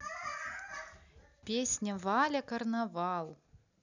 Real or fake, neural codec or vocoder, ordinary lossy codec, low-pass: real; none; none; 7.2 kHz